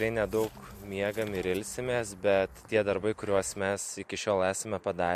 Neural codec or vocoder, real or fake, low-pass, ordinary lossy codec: none; real; 14.4 kHz; MP3, 64 kbps